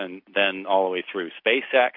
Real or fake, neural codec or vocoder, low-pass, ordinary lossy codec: real; none; 5.4 kHz; MP3, 48 kbps